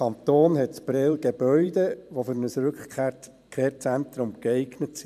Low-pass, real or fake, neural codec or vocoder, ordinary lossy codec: 14.4 kHz; fake; vocoder, 44.1 kHz, 128 mel bands every 512 samples, BigVGAN v2; MP3, 96 kbps